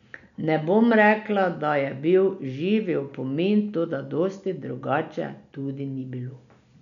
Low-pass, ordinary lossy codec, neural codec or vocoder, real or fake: 7.2 kHz; none; none; real